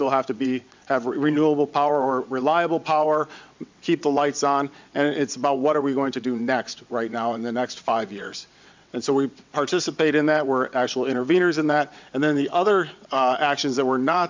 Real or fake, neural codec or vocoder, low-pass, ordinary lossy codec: fake; vocoder, 22.05 kHz, 80 mel bands, WaveNeXt; 7.2 kHz; MP3, 64 kbps